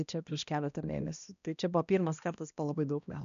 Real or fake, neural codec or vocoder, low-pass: fake; codec, 16 kHz, 1 kbps, X-Codec, HuBERT features, trained on balanced general audio; 7.2 kHz